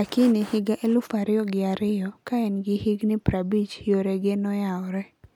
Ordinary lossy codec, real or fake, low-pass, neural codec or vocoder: MP3, 96 kbps; real; 19.8 kHz; none